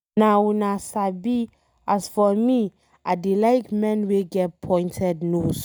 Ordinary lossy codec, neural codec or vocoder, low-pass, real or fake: none; none; none; real